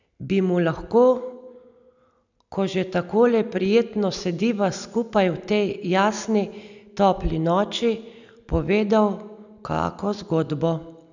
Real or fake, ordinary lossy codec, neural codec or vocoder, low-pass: real; none; none; 7.2 kHz